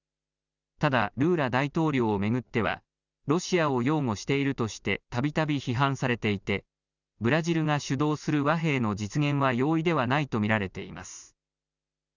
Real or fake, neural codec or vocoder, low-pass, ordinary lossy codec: real; none; 7.2 kHz; none